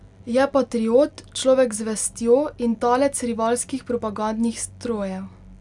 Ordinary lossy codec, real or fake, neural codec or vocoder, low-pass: none; real; none; 10.8 kHz